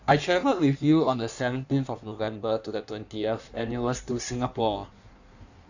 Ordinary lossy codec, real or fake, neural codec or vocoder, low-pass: none; fake; codec, 16 kHz in and 24 kHz out, 1.1 kbps, FireRedTTS-2 codec; 7.2 kHz